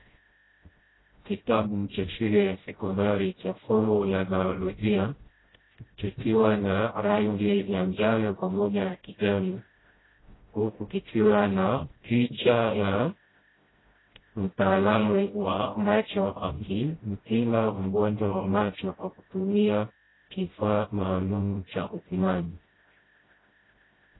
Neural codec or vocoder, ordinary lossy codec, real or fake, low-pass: codec, 16 kHz, 0.5 kbps, FreqCodec, smaller model; AAC, 16 kbps; fake; 7.2 kHz